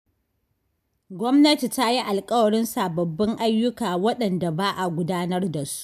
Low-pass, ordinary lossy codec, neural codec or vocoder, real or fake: 14.4 kHz; none; none; real